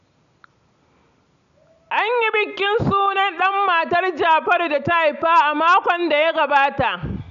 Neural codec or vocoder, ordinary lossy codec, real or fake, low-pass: none; none; real; 7.2 kHz